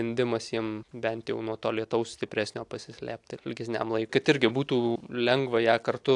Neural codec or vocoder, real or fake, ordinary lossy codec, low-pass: none; real; AAC, 64 kbps; 10.8 kHz